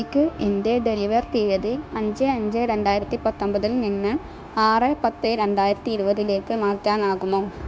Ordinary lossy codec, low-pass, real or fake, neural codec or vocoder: none; none; fake; codec, 16 kHz, 0.9 kbps, LongCat-Audio-Codec